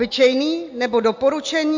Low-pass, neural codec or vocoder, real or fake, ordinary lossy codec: 7.2 kHz; none; real; MP3, 48 kbps